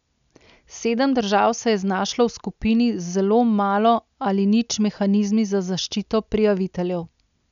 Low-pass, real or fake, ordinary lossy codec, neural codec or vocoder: 7.2 kHz; real; none; none